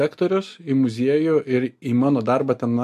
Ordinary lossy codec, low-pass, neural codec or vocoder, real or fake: MP3, 96 kbps; 14.4 kHz; none; real